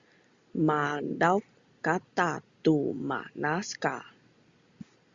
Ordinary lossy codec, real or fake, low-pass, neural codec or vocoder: Opus, 64 kbps; real; 7.2 kHz; none